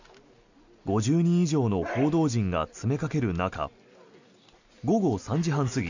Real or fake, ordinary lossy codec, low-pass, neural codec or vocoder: real; none; 7.2 kHz; none